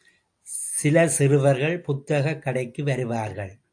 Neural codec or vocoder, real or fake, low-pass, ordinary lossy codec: none; real; 9.9 kHz; AAC, 64 kbps